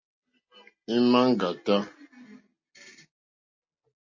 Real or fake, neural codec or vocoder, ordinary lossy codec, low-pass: real; none; MP3, 32 kbps; 7.2 kHz